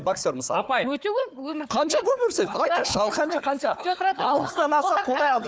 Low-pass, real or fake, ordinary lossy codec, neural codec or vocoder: none; fake; none; codec, 16 kHz, 4 kbps, FunCodec, trained on Chinese and English, 50 frames a second